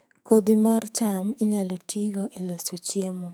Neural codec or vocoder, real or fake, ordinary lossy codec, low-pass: codec, 44.1 kHz, 2.6 kbps, SNAC; fake; none; none